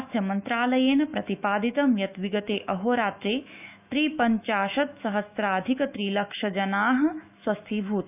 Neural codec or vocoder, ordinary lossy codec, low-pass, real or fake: autoencoder, 48 kHz, 128 numbers a frame, DAC-VAE, trained on Japanese speech; none; 3.6 kHz; fake